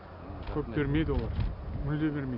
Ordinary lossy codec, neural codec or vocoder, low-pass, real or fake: none; none; 5.4 kHz; real